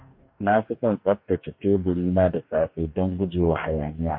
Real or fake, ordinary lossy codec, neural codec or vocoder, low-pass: fake; MP3, 32 kbps; codec, 44.1 kHz, 2.6 kbps, DAC; 5.4 kHz